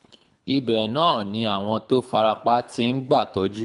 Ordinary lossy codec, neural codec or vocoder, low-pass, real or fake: none; codec, 24 kHz, 3 kbps, HILCodec; 10.8 kHz; fake